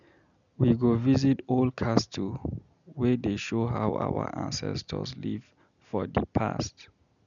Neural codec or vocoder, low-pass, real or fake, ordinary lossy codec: none; 7.2 kHz; real; none